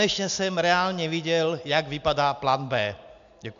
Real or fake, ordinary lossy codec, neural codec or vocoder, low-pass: real; MP3, 64 kbps; none; 7.2 kHz